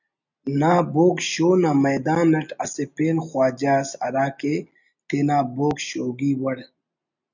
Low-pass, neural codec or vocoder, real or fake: 7.2 kHz; none; real